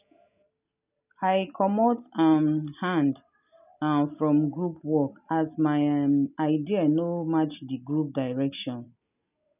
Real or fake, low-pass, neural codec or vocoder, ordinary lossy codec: real; 3.6 kHz; none; none